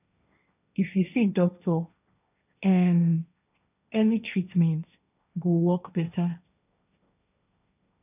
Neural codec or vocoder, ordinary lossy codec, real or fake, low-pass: codec, 16 kHz, 1.1 kbps, Voila-Tokenizer; none; fake; 3.6 kHz